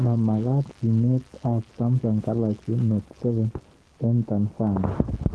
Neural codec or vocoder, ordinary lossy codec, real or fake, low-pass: codec, 44.1 kHz, 7.8 kbps, Pupu-Codec; Opus, 16 kbps; fake; 10.8 kHz